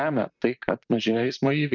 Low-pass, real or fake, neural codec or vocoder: 7.2 kHz; fake; vocoder, 44.1 kHz, 128 mel bands, Pupu-Vocoder